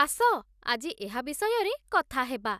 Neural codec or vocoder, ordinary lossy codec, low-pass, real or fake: none; none; 14.4 kHz; real